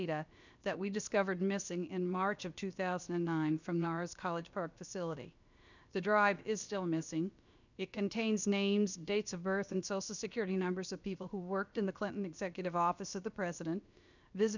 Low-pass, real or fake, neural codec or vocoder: 7.2 kHz; fake; codec, 16 kHz, about 1 kbps, DyCAST, with the encoder's durations